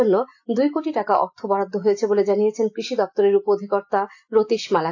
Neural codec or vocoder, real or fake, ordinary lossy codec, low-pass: none; real; AAC, 48 kbps; 7.2 kHz